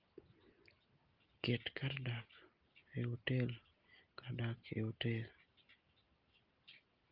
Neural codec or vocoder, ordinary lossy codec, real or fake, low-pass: none; Opus, 24 kbps; real; 5.4 kHz